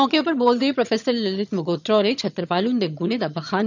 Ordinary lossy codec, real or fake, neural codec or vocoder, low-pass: none; fake; vocoder, 22.05 kHz, 80 mel bands, HiFi-GAN; 7.2 kHz